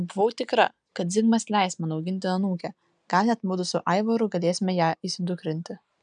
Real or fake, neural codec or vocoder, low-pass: real; none; 9.9 kHz